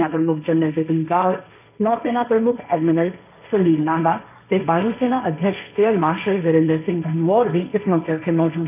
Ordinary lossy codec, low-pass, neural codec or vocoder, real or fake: none; 3.6 kHz; codec, 16 kHz, 1.1 kbps, Voila-Tokenizer; fake